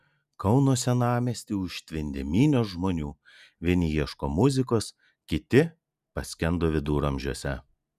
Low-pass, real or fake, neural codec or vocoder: 14.4 kHz; real; none